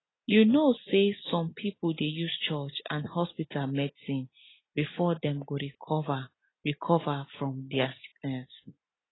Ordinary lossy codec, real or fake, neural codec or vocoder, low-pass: AAC, 16 kbps; real; none; 7.2 kHz